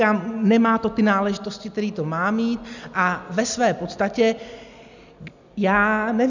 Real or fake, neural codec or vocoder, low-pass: real; none; 7.2 kHz